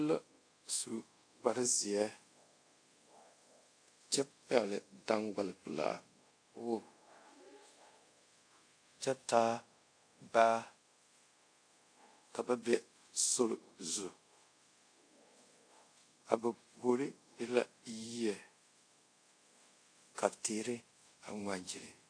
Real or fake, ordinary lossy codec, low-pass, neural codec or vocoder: fake; AAC, 48 kbps; 9.9 kHz; codec, 24 kHz, 0.5 kbps, DualCodec